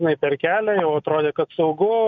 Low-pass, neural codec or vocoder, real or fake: 7.2 kHz; none; real